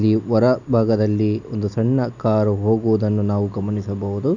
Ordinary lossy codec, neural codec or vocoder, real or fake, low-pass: none; none; real; 7.2 kHz